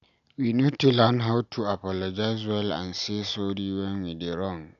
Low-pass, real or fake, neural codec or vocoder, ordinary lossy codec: 7.2 kHz; real; none; none